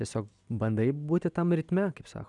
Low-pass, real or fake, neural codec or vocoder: 10.8 kHz; real; none